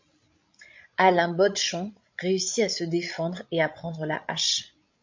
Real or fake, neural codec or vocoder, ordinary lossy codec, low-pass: fake; codec, 16 kHz, 16 kbps, FreqCodec, larger model; MP3, 48 kbps; 7.2 kHz